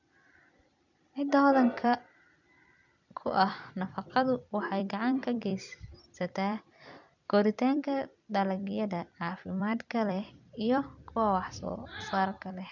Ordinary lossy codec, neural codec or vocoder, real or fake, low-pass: none; vocoder, 44.1 kHz, 128 mel bands every 256 samples, BigVGAN v2; fake; 7.2 kHz